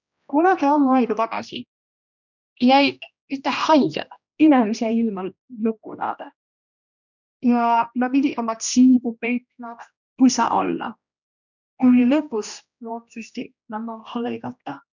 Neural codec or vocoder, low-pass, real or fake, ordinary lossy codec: codec, 16 kHz, 1 kbps, X-Codec, HuBERT features, trained on general audio; 7.2 kHz; fake; none